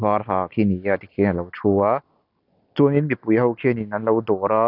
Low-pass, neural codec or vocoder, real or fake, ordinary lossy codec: 5.4 kHz; none; real; none